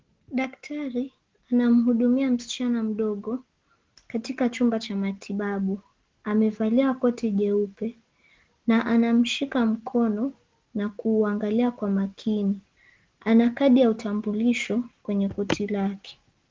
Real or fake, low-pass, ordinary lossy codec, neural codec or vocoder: real; 7.2 kHz; Opus, 16 kbps; none